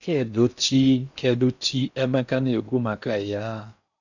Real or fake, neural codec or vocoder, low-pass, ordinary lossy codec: fake; codec, 16 kHz in and 24 kHz out, 0.6 kbps, FocalCodec, streaming, 4096 codes; 7.2 kHz; none